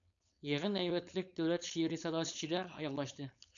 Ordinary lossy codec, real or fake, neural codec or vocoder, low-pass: MP3, 96 kbps; fake; codec, 16 kHz, 4.8 kbps, FACodec; 7.2 kHz